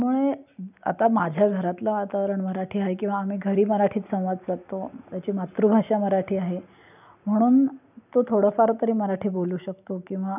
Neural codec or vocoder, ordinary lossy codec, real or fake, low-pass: none; none; real; 3.6 kHz